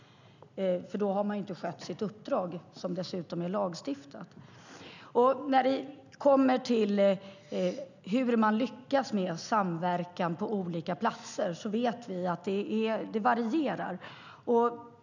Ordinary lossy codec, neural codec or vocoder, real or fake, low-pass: none; none; real; 7.2 kHz